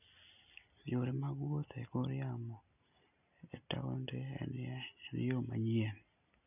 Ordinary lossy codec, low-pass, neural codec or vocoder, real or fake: none; 3.6 kHz; none; real